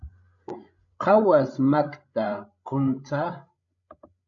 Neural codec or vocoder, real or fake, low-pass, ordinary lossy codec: codec, 16 kHz, 16 kbps, FreqCodec, larger model; fake; 7.2 kHz; MP3, 64 kbps